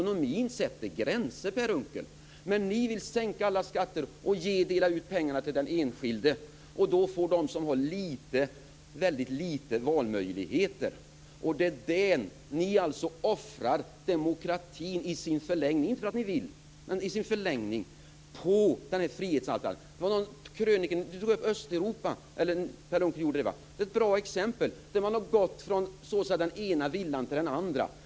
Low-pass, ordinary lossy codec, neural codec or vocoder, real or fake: none; none; none; real